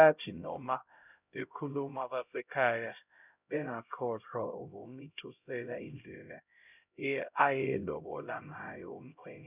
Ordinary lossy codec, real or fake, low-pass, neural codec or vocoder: none; fake; 3.6 kHz; codec, 16 kHz, 0.5 kbps, X-Codec, HuBERT features, trained on LibriSpeech